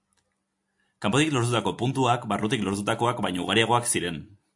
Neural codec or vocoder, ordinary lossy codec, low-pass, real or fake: none; AAC, 64 kbps; 10.8 kHz; real